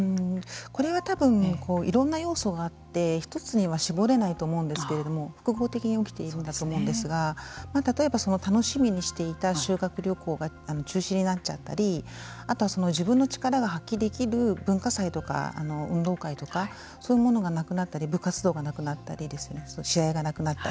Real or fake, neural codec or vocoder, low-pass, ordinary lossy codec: real; none; none; none